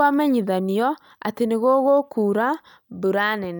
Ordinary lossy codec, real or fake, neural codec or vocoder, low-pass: none; real; none; none